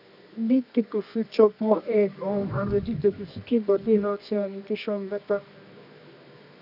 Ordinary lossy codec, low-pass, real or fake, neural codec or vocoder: none; 5.4 kHz; fake; codec, 24 kHz, 0.9 kbps, WavTokenizer, medium music audio release